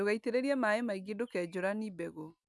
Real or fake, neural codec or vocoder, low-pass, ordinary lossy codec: real; none; none; none